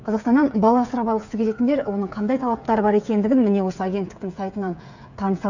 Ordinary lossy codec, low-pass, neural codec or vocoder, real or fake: none; 7.2 kHz; codec, 16 kHz, 8 kbps, FreqCodec, smaller model; fake